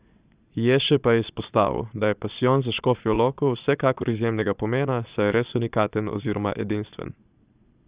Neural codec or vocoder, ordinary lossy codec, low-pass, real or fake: vocoder, 22.05 kHz, 80 mel bands, Vocos; Opus, 64 kbps; 3.6 kHz; fake